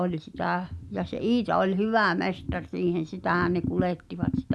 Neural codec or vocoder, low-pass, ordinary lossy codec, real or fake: none; none; none; real